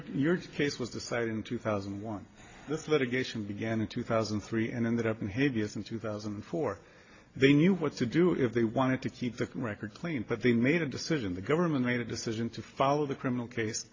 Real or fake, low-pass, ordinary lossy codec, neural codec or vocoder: real; 7.2 kHz; AAC, 32 kbps; none